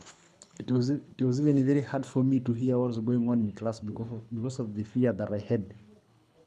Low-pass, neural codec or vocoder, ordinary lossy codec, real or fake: none; codec, 24 kHz, 6 kbps, HILCodec; none; fake